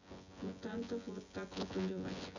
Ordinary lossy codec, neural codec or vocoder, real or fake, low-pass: none; vocoder, 24 kHz, 100 mel bands, Vocos; fake; 7.2 kHz